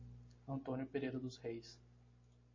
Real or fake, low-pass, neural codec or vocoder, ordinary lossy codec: real; 7.2 kHz; none; MP3, 32 kbps